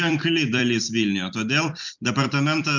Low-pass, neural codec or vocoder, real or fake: 7.2 kHz; vocoder, 44.1 kHz, 128 mel bands every 256 samples, BigVGAN v2; fake